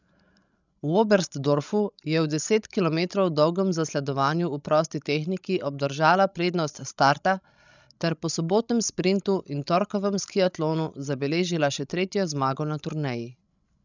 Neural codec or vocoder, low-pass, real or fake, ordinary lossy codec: codec, 16 kHz, 16 kbps, FreqCodec, larger model; 7.2 kHz; fake; none